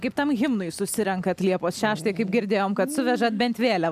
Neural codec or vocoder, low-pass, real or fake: none; 14.4 kHz; real